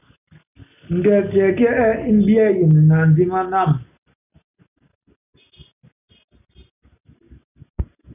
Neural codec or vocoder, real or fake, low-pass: none; real; 3.6 kHz